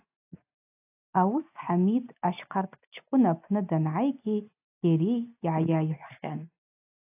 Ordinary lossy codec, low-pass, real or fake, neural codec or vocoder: AAC, 32 kbps; 3.6 kHz; real; none